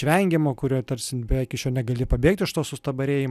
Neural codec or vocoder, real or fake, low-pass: none; real; 14.4 kHz